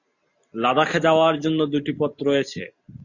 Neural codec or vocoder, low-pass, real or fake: none; 7.2 kHz; real